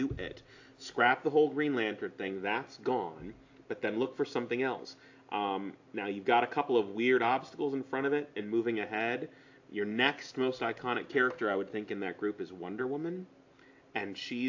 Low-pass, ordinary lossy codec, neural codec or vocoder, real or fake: 7.2 kHz; AAC, 48 kbps; none; real